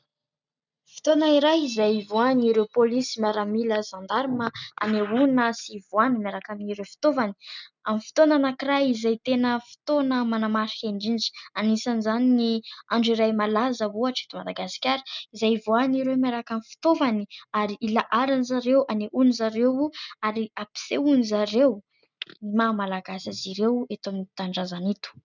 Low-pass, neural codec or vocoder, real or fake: 7.2 kHz; none; real